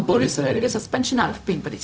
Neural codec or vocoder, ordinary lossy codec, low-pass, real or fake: codec, 16 kHz, 0.4 kbps, LongCat-Audio-Codec; none; none; fake